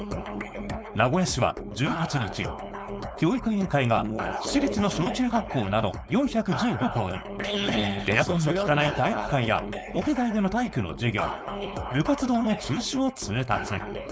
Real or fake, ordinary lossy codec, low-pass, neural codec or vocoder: fake; none; none; codec, 16 kHz, 4.8 kbps, FACodec